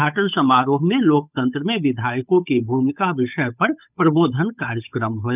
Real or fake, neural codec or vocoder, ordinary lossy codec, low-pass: fake; codec, 16 kHz, 8 kbps, FunCodec, trained on Chinese and English, 25 frames a second; none; 3.6 kHz